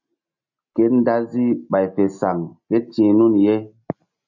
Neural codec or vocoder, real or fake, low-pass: none; real; 7.2 kHz